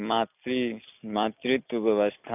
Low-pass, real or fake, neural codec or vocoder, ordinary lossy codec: 3.6 kHz; real; none; none